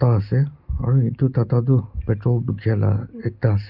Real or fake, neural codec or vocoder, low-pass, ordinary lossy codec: real; none; 5.4 kHz; Opus, 24 kbps